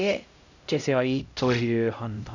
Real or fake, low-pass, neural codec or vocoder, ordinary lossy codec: fake; 7.2 kHz; codec, 16 kHz, 0.5 kbps, X-Codec, HuBERT features, trained on LibriSpeech; none